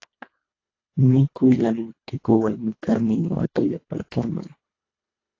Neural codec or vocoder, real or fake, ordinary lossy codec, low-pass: codec, 24 kHz, 1.5 kbps, HILCodec; fake; AAC, 32 kbps; 7.2 kHz